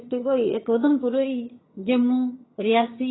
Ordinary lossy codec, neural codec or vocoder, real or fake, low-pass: AAC, 16 kbps; vocoder, 22.05 kHz, 80 mel bands, HiFi-GAN; fake; 7.2 kHz